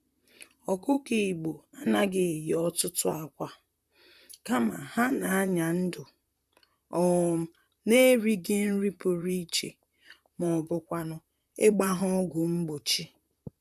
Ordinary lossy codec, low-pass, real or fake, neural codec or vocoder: none; 14.4 kHz; fake; vocoder, 44.1 kHz, 128 mel bands, Pupu-Vocoder